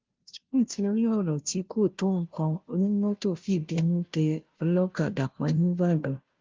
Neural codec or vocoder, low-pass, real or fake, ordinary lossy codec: codec, 16 kHz, 0.5 kbps, FunCodec, trained on Chinese and English, 25 frames a second; 7.2 kHz; fake; Opus, 32 kbps